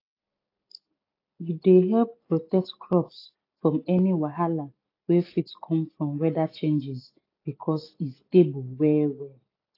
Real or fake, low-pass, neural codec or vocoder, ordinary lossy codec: real; 5.4 kHz; none; AAC, 32 kbps